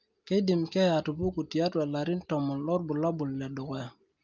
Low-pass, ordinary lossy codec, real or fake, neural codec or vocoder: 7.2 kHz; Opus, 24 kbps; real; none